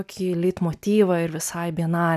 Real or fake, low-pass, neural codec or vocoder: real; 14.4 kHz; none